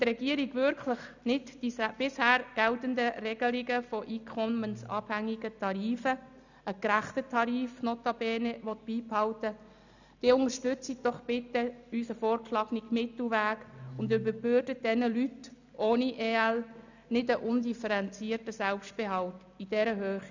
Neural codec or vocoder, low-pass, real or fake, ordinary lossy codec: none; 7.2 kHz; real; none